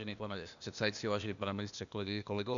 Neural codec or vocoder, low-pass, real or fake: codec, 16 kHz, 0.8 kbps, ZipCodec; 7.2 kHz; fake